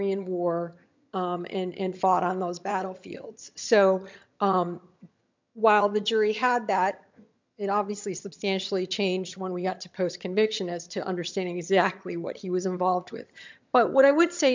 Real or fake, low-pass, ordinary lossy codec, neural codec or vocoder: fake; 7.2 kHz; MP3, 64 kbps; vocoder, 22.05 kHz, 80 mel bands, HiFi-GAN